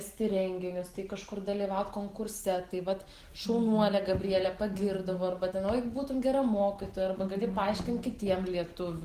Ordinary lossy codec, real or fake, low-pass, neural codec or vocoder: Opus, 16 kbps; real; 14.4 kHz; none